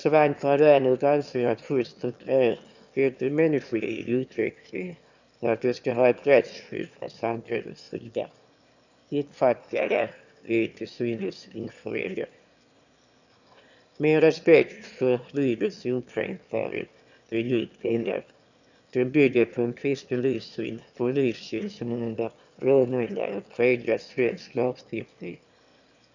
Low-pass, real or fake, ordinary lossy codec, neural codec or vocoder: 7.2 kHz; fake; none; autoencoder, 22.05 kHz, a latent of 192 numbers a frame, VITS, trained on one speaker